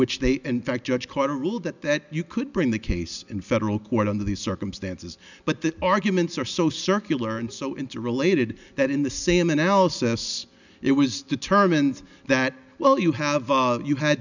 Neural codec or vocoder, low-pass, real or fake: none; 7.2 kHz; real